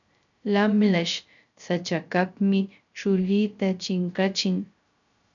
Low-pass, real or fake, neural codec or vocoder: 7.2 kHz; fake; codec, 16 kHz, 0.3 kbps, FocalCodec